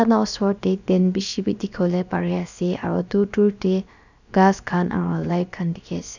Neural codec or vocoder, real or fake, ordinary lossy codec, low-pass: codec, 16 kHz, about 1 kbps, DyCAST, with the encoder's durations; fake; none; 7.2 kHz